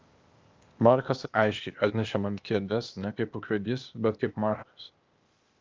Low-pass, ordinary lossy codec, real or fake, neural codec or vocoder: 7.2 kHz; Opus, 24 kbps; fake; codec, 16 kHz, 0.8 kbps, ZipCodec